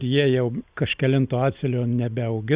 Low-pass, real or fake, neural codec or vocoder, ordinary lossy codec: 3.6 kHz; real; none; Opus, 64 kbps